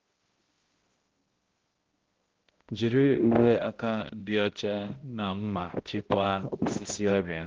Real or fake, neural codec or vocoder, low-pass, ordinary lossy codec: fake; codec, 16 kHz, 0.5 kbps, X-Codec, HuBERT features, trained on balanced general audio; 7.2 kHz; Opus, 16 kbps